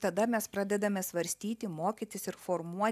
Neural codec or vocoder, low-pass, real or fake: none; 14.4 kHz; real